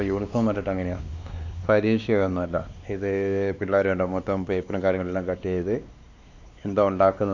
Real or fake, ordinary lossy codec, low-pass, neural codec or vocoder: fake; none; 7.2 kHz; codec, 16 kHz, 2 kbps, X-Codec, WavLM features, trained on Multilingual LibriSpeech